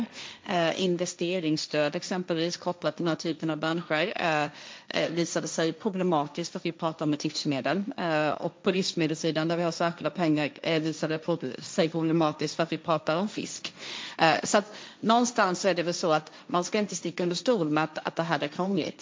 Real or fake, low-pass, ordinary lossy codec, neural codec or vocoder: fake; none; none; codec, 16 kHz, 1.1 kbps, Voila-Tokenizer